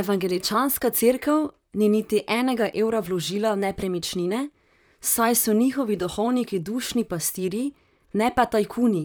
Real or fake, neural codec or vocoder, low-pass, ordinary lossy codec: fake; vocoder, 44.1 kHz, 128 mel bands, Pupu-Vocoder; none; none